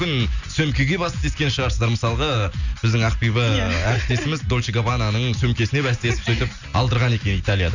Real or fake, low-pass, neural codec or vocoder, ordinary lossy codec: real; 7.2 kHz; none; none